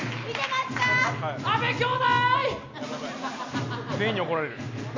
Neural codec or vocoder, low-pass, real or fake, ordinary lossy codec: none; 7.2 kHz; real; MP3, 48 kbps